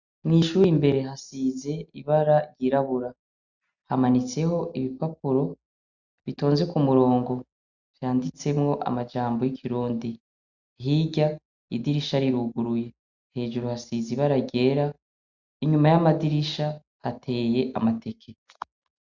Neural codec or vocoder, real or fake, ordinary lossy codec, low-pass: none; real; Opus, 64 kbps; 7.2 kHz